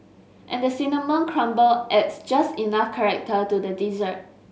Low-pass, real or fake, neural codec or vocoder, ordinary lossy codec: none; real; none; none